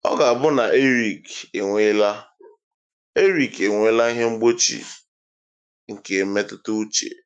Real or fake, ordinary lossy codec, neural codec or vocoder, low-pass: fake; none; autoencoder, 48 kHz, 128 numbers a frame, DAC-VAE, trained on Japanese speech; 9.9 kHz